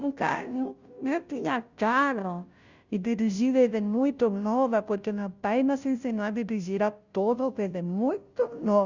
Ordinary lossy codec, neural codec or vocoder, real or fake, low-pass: Opus, 64 kbps; codec, 16 kHz, 0.5 kbps, FunCodec, trained on Chinese and English, 25 frames a second; fake; 7.2 kHz